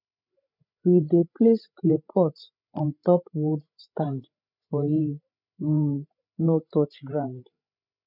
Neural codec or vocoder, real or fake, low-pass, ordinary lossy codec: codec, 16 kHz, 16 kbps, FreqCodec, larger model; fake; 5.4 kHz; none